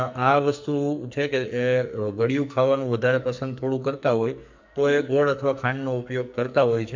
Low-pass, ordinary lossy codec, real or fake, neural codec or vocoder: 7.2 kHz; MP3, 64 kbps; fake; codec, 44.1 kHz, 2.6 kbps, SNAC